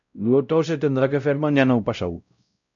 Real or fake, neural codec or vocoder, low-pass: fake; codec, 16 kHz, 0.5 kbps, X-Codec, WavLM features, trained on Multilingual LibriSpeech; 7.2 kHz